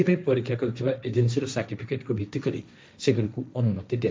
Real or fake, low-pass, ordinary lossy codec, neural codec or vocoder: fake; none; none; codec, 16 kHz, 1.1 kbps, Voila-Tokenizer